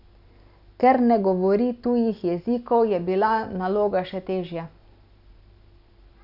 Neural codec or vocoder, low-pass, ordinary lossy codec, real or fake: none; 5.4 kHz; none; real